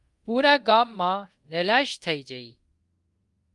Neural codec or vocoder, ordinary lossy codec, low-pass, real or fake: codec, 24 kHz, 0.5 kbps, DualCodec; Opus, 32 kbps; 10.8 kHz; fake